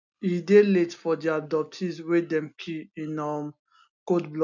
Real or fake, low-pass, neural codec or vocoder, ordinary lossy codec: real; 7.2 kHz; none; none